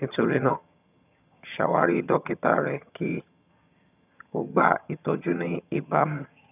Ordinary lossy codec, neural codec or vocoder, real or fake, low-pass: none; vocoder, 22.05 kHz, 80 mel bands, HiFi-GAN; fake; 3.6 kHz